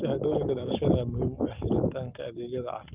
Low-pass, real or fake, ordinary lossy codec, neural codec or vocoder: 3.6 kHz; fake; Opus, 24 kbps; codec, 44.1 kHz, 7.8 kbps, Pupu-Codec